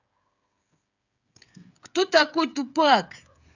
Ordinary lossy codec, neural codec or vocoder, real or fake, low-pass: none; codec, 16 kHz, 8 kbps, FreqCodec, smaller model; fake; 7.2 kHz